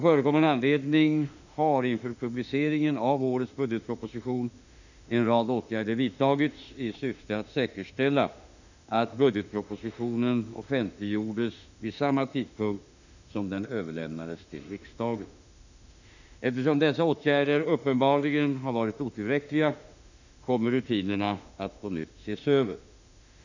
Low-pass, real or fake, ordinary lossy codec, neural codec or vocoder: 7.2 kHz; fake; none; autoencoder, 48 kHz, 32 numbers a frame, DAC-VAE, trained on Japanese speech